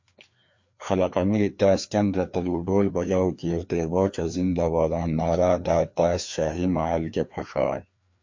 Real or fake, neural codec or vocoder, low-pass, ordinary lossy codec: fake; codec, 16 kHz, 2 kbps, FreqCodec, larger model; 7.2 kHz; MP3, 48 kbps